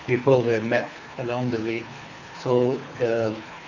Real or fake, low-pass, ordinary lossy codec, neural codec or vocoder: fake; 7.2 kHz; none; codec, 24 kHz, 3 kbps, HILCodec